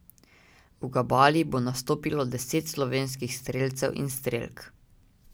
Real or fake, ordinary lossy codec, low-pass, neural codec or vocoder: real; none; none; none